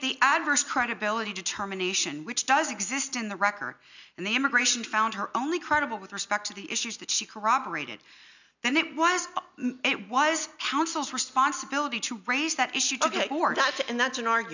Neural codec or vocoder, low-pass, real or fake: none; 7.2 kHz; real